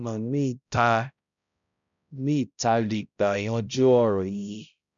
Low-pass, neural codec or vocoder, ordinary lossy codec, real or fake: 7.2 kHz; codec, 16 kHz, 0.5 kbps, X-Codec, HuBERT features, trained on balanced general audio; none; fake